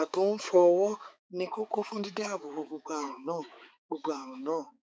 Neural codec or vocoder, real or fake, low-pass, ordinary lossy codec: codec, 16 kHz, 4 kbps, X-Codec, HuBERT features, trained on balanced general audio; fake; none; none